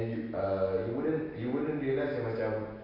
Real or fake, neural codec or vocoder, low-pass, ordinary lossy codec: real; none; 5.4 kHz; AAC, 24 kbps